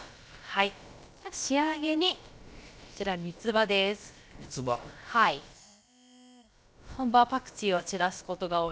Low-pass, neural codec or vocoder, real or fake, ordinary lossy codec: none; codec, 16 kHz, about 1 kbps, DyCAST, with the encoder's durations; fake; none